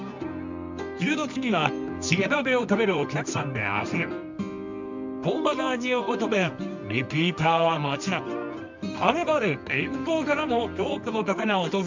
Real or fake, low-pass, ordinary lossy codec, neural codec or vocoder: fake; 7.2 kHz; MP3, 64 kbps; codec, 24 kHz, 0.9 kbps, WavTokenizer, medium music audio release